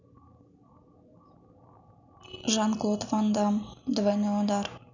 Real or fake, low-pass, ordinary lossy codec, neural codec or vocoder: real; 7.2 kHz; none; none